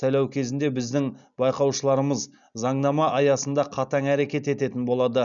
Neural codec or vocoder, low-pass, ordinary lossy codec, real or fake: none; 7.2 kHz; none; real